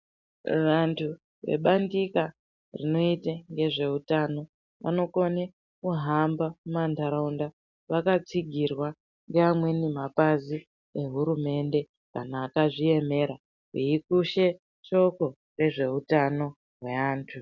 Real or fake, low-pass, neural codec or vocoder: real; 7.2 kHz; none